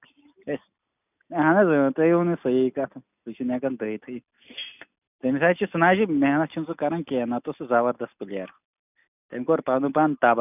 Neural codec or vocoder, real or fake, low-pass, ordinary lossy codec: none; real; 3.6 kHz; none